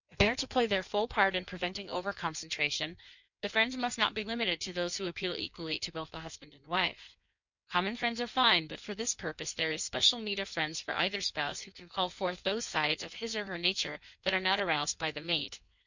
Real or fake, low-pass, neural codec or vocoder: fake; 7.2 kHz; codec, 16 kHz in and 24 kHz out, 1.1 kbps, FireRedTTS-2 codec